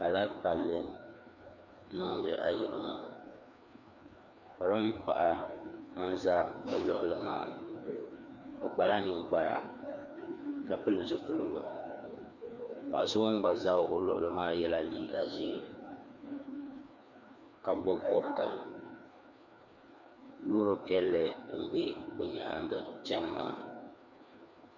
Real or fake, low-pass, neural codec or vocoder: fake; 7.2 kHz; codec, 16 kHz, 2 kbps, FreqCodec, larger model